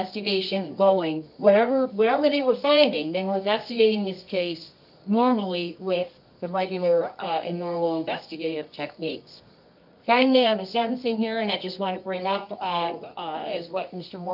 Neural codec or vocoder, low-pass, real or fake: codec, 24 kHz, 0.9 kbps, WavTokenizer, medium music audio release; 5.4 kHz; fake